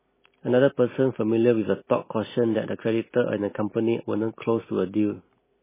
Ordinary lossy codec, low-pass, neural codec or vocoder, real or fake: MP3, 16 kbps; 3.6 kHz; none; real